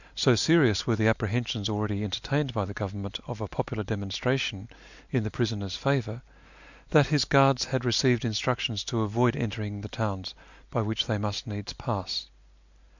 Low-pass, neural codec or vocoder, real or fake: 7.2 kHz; none; real